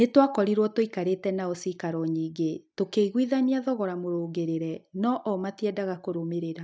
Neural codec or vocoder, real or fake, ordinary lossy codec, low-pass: none; real; none; none